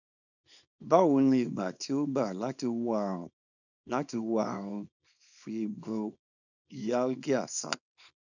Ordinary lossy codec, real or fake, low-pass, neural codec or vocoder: none; fake; 7.2 kHz; codec, 24 kHz, 0.9 kbps, WavTokenizer, small release